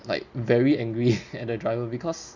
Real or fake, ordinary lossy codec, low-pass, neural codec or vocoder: real; none; 7.2 kHz; none